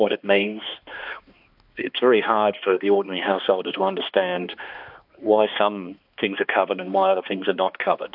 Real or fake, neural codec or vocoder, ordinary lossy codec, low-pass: fake; codec, 16 kHz, 2 kbps, X-Codec, HuBERT features, trained on balanced general audio; AAC, 48 kbps; 5.4 kHz